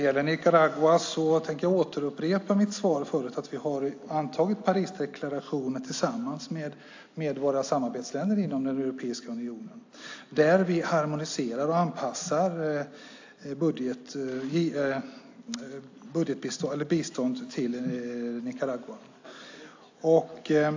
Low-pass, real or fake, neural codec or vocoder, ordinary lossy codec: 7.2 kHz; real; none; AAC, 48 kbps